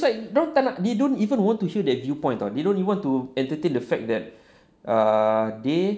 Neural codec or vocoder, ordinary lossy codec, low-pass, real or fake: none; none; none; real